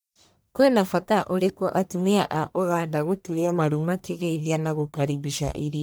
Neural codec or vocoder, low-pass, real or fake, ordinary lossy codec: codec, 44.1 kHz, 1.7 kbps, Pupu-Codec; none; fake; none